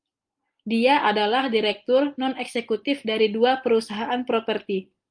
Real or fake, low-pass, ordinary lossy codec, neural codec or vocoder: real; 9.9 kHz; Opus, 32 kbps; none